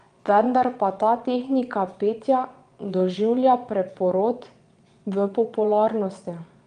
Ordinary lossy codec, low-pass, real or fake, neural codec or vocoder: Opus, 32 kbps; 9.9 kHz; fake; vocoder, 22.05 kHz, 80 mel bands, Vocos